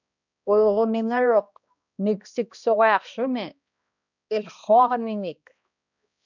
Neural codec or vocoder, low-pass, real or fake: codec, 16 kHz, 1 kbps, X-Codec, HuBERT features, trained on balanced general audio; 7.2 kHz; fake